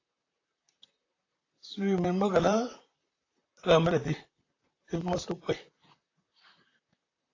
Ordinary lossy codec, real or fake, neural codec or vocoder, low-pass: AAC, 32 kbps; fake; vocoder, 44.1 kHz, 128 mel bands, Pupu-Vocoder; 7.2 kHz